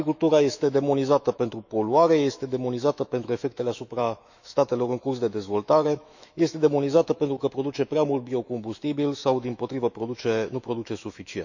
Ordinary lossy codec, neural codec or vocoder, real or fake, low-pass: none; autoencoder, 48 kHz, 128 numbers a frame, DAC-VAE, trained on Japanese speech; fake; 7.2 kHz